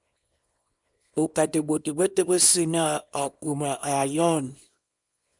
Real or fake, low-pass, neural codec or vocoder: fake; 10.8 kHz; codec, 24 kHz, 0.9 kbps, WavTokenizer, small release